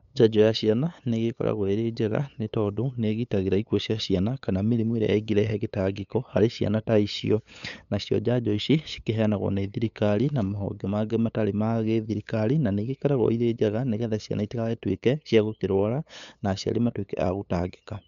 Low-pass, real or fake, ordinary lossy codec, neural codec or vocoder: 7.2 kHz; fake; none; codec, 16 kHz, 8 kbps, FunCodec, trained on LibriTTS, 25 frames a second